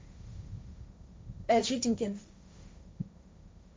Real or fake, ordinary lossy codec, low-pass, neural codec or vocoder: fake; none; none; codec, 16 kHz, 1.1 kbps, Voila-Tokenizer